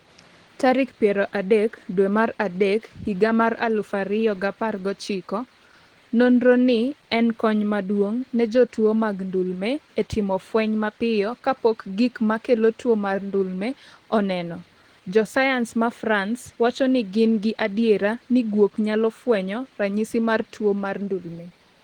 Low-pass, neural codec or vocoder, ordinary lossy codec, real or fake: 19.8 kHz; none; Opus, 16 kbps; real